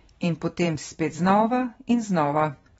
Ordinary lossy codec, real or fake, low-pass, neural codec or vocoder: AAC, 24 kbps; fake; 19.8 kHz; vocoder, 44.1 kHz, 128 mel bands every 512 samples, BigVGAN v2